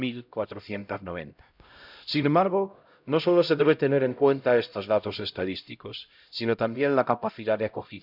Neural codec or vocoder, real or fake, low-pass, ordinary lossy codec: codec, 16 kHz, 0.5 kbps, X-Codec, HuBERT features, trained on LibriSpeech; fake; 5.4 kHz; none